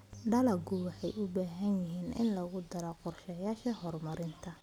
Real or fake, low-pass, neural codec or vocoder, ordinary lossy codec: real; 19.8 kHz; none; none